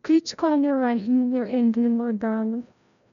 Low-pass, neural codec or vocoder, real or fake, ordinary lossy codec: 7.2 kHz; codec, 16 kHz, 0.5 kbps, FreqCodec, larger model; fake; none